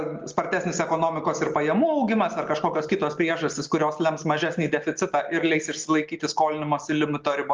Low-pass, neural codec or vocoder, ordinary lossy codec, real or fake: 7.2 kHz; none; Opus, 32 kbps; real